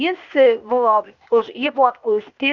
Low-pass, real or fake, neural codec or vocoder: 7.2 kHz; fake; codec, 16 kHz, 0.8 kbps, ZipCodec